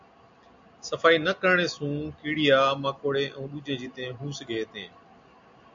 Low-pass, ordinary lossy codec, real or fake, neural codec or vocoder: 7.2 kHz; AAC, 64 kbps; real; none